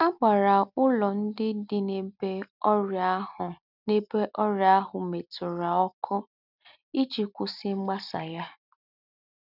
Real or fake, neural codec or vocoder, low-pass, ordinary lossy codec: real; none; 5.4 kHz; none